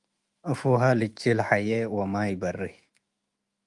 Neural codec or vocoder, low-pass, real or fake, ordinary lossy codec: autoencoder, 48 kHz, 128 numbers a frame, DAC-VAE, trained on Japanese speech; 10.8 kHz; fake; Opus, 32 kbps